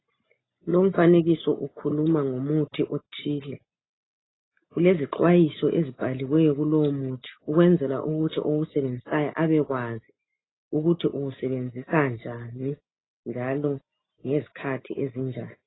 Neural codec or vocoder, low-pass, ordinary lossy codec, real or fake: none; 7.2 kHz; AAC, 16 kbps; real